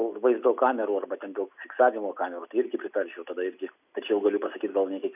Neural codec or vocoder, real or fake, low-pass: none; real; 3.6 kHz